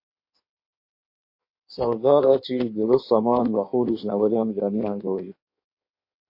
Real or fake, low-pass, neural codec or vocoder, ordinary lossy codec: fake; 5.4 kHz; codec, 16 kHz in and 24 kHz out, 1.1 kbps, FireRedTTS-2 codec; MP3, 32 kbps